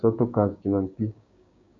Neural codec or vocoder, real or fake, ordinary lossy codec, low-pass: codec, 16 kHz, 6 kbps, DAC; fake; MP3, 64 kbps; 7.2 kHz